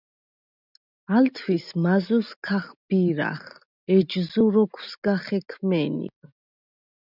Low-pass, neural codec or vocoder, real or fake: 5.4 kHz; none; real